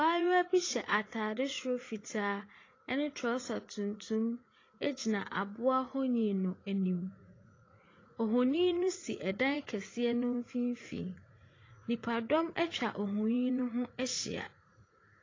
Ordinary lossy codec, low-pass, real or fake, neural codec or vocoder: AAC, 32 kbps; 7.2 kHz; fake; vocoder, 44.1 kHz, 80 mel bands, Vocos